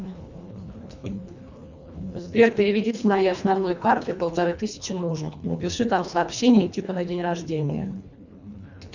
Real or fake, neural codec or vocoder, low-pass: fake; codec, 24 kHz, 1.5 kbps, HILCodec; 7.2 kHz